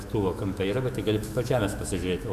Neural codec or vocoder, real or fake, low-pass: autoencoder, 48 kHz, 128 numbers a frame, DAC-VAE, trained on Japanese speech; fake; 14.4 kHz